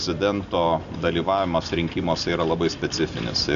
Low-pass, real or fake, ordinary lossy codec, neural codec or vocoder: 7.2 kHz; real; AAC, 96 kbps; none